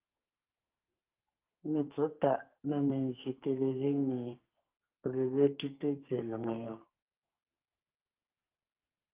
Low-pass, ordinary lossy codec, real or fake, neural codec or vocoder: 3.6 kHz; Opus, 32 kbps; fake; codec, 44.1 kHz, 2.6 kbps, SNAC